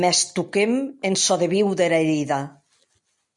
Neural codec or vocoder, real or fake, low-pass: none; real; 10.8 kHz